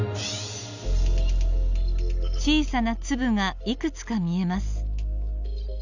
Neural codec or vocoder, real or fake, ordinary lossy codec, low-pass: none; real; none; 7.2 kHz